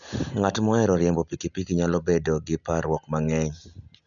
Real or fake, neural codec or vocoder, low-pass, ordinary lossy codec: real; none; 7.2 kHz; none